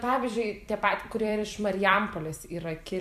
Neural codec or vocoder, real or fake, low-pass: vocoder, 44.1 kHz, 128 mel bands every 256 samples, BigVGAN v2; fake; 14.4 kHz